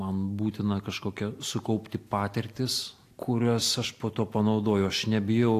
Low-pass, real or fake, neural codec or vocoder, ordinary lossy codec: 14.4 kHz; real; none; AAC, 64 kbps